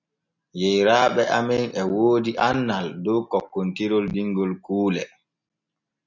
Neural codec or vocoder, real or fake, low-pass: none; real; 7.2 kHz